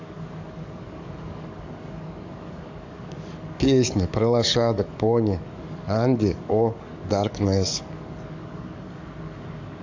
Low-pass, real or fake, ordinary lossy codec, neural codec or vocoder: 7.2 kHz; fake; AAC, 48 kbps; codec, 44.1 kHz, 7.8 kbps, DAC